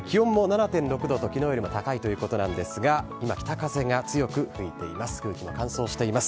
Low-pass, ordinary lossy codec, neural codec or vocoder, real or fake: none; none; none; real